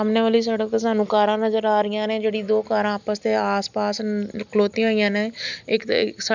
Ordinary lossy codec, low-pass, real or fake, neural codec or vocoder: none; 7.2 kHz; real; none